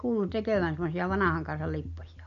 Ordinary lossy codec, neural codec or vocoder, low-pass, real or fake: MP3, 48 kbps; none; 7.2 kHz; real